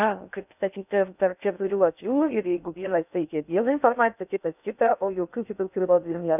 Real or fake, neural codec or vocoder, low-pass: fake; codec, 16 kHz in and 24 kHz out, 0.6 kbps, FocalCodec, streaming, 2048 codes; 3.6 kHz